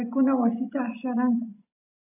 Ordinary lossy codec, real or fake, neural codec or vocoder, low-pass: AAC, 24 kbps; real; none; 3.6 kHz